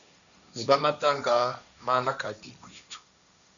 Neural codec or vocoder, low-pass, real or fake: codec, 16 kHz, 1.1 kbps, Voila-Tokenizer; 7.2 kHz; fake